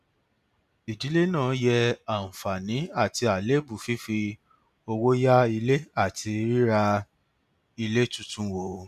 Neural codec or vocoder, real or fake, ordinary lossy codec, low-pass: none; real; none; 14.4 kHz